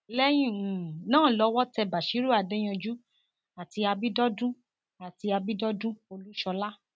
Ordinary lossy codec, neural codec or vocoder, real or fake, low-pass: none; none; real; none